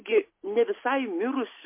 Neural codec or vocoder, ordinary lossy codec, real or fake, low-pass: none; MP3, 24 kbps; real; 3.6 kHz